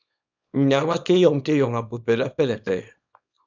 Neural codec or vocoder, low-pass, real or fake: codec, 24 kHz, 0.9 kbps, WavTokenizer, small release; 7.2 kHz; fake